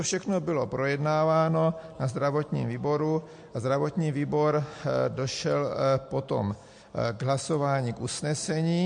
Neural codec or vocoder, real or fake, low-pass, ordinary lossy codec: none; real; 9.9 kHz; MP3, 48 kbps